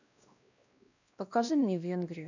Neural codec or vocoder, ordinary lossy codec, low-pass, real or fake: codec, 16 kHz, 1 kbps, X-Codec, WavLM features, trained on Multilingual LibriSpeech; none; 7.2 kHz; fake